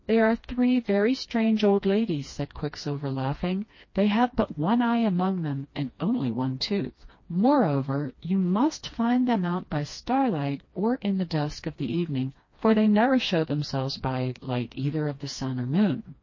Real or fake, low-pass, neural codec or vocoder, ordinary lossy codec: fake; 7.2 kHz; codec, 16 kHz, 2 kbps, FreqCodec, smaller model; MP3, 32 kbps